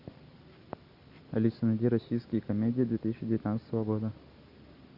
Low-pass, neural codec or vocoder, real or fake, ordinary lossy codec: 5.4 kHz; none; real; AAC, 32 kbps